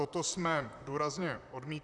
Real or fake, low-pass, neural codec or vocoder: fake; 10.8 kHz; vocoder, 44.1 kHz, 128 mel bands, Pupu-Vocoder